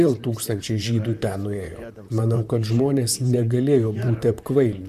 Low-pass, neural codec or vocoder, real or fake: 14.4 kHz; vocoder, 44.1 kHz, 128 mel bands, Pupu-Vocoder; fake